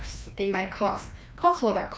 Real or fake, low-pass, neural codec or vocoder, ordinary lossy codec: fake; none; codec, 16 kHz, 1 kbps, FreqCodec, larger model; none